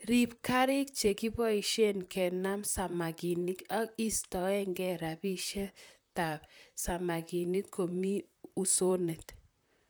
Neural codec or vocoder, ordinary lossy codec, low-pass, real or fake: vocoder, 44.1 kHz, 128 mel bands, Pupu-Vocoder; none; none; fake